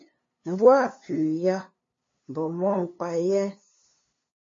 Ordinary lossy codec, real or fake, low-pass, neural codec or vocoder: MP3, 32 kbps; fake; 7.2 kHz; codec, 16 kHz, 2 kbps, FunCodec, trained on LibriTTS, 25 frames a second